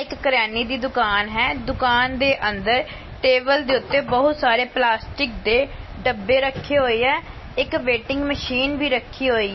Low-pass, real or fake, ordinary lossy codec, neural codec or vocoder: 7.2 kHz; real; MP3, 24 kbps; none